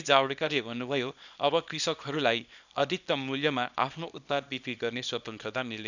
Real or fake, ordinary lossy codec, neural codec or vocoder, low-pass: fake; none; codec, 24 kHz, 0.9 kbps, WavTokenizer, small release; 7.2 kHz